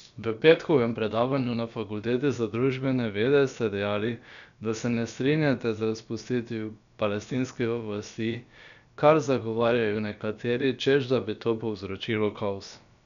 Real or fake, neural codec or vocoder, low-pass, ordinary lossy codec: fake; codec, 16 kHz, about 1 kbps, DyCAST, with the encoder's durations; 7.2 kHz; none